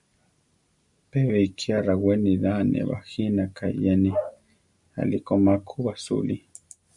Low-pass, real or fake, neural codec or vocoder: 10.8 kHz; real; none